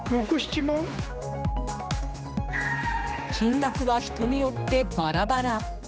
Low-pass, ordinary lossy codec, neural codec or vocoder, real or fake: none; none; codec, 16 kHz, 2 kbps, X-Codec, HuBERT features, trained on general audio; fake